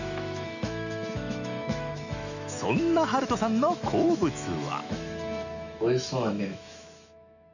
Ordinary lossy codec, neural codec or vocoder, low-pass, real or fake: none; autoencoder, 48 kHz, 128 numbers a frame, DAC-VAE, trained on Japanese speech; 7.2 kHz; fake